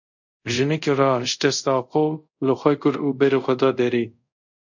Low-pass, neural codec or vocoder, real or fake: 7.2 kHz; codec, 24 kHz, 0.5 kbps, DualCodec; fake